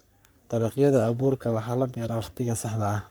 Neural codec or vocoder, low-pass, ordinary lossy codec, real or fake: codec, 44.1 kHz, 3.4 kbps, Pupu-Codec; none; none; fake